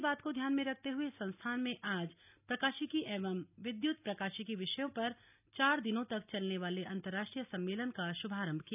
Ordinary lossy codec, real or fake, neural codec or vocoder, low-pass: none; real; none; 3.6 kHz